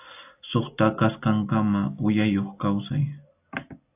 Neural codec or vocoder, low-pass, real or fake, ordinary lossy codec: none; 3.6 kHz; real; AAC, 32 kbps